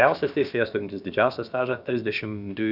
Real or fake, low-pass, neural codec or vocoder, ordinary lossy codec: fake; 5.4 kHz; codec, 16 kHz, about 1 kbps, DyCAST, with the encoder's durations; Opus, 64 kbps